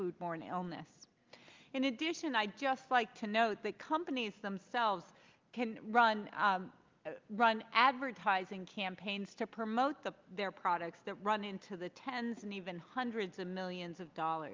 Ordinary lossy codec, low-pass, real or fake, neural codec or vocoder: Opus, 24 kbps; 7.2 kHz; real; none